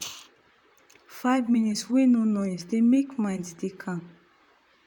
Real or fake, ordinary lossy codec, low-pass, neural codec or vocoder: fake; none; 19.8 kHz; vocoder, 44.1 kHz, 128 mel bands, Pupu-Vocoder